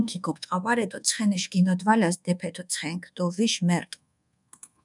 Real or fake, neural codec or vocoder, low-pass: fake; codec, 24 kHz, 1.2 kbps, DualCodec; 10.8 kHz